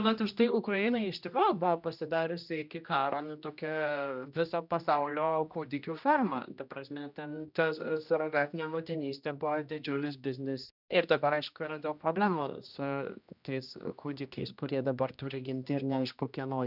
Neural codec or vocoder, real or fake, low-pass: codec, 16 kHz, 1 kbps, X-Codec, HuBERT features, trained on general audio; fake; 5.4 kHz